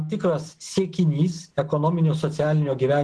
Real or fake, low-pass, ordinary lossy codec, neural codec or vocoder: real; 10.8 kHz; Opus, 16 kbps; none